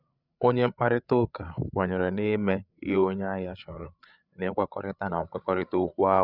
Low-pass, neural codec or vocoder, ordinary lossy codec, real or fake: 5.4 kHz; codec, 16 kHz, 8 kbps, FreqCodec, larger model; none; fake